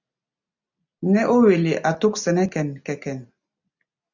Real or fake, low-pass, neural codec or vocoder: real; 7.2 kHz; none